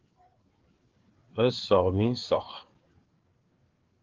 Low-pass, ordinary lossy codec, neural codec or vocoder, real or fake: 7.2 kHz; Opus, 16 kbps; codec, 16 kHz, 4 kbps, FreqCodec, larger model; fake